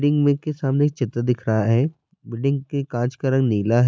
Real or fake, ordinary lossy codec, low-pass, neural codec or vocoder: real; none; none; none